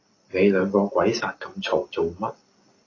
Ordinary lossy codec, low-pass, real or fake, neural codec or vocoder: AAC, 32 kbps; 7.2 kHz; real; none